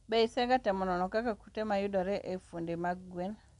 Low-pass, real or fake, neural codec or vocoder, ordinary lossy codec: 10.8 kHz; real; none; none